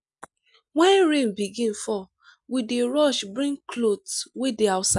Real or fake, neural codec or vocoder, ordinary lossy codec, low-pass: real; none; none; 10.8 kHz